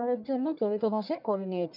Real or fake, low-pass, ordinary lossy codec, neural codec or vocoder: fake; 5.4 kHz; none; codec, 44.1 kHz, 1.7 kbps, Pupu-Codec